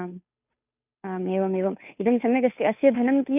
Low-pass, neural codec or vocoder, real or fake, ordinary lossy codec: 3.6 kHz; codec, 16 kHz, 2 kbps, FunCodec, trained on Chinese and English, 25 frames a second; fake; none